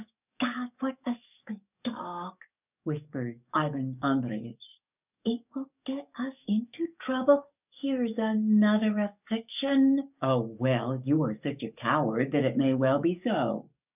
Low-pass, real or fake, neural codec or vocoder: 3.6 kHz; fake; codec, 44.1 kHz, 7.8 kbps, DAC